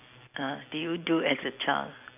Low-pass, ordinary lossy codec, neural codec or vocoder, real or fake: 3.6 kHz; none; none; real